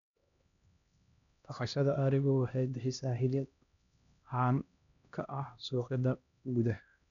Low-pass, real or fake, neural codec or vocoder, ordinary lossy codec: 7.2 kHz; fake; codec, 16 kHz, 1 kbps, X-Codec, HuBERT features, trained on LibriSpeech; none